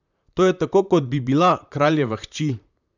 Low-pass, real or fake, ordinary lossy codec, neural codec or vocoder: 7.2 kHz; fake; none; vocoder, 44.1 kHz, 128 mel bands, Pupu-Vocoder